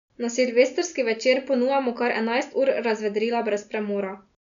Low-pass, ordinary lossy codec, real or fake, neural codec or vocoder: 7.2 kHz; none; real; none